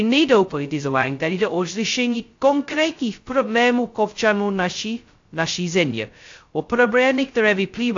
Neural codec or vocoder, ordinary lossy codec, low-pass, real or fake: codec, 16 kHz, 0.2 kbps, FocalCodec; AAC, 48 kbps; 7.2 kHz; fake